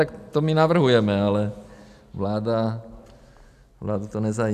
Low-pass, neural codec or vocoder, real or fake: 14.4 kHz; none; real